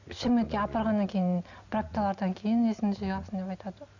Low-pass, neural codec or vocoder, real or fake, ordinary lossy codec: 7.2 kHz; none; real; none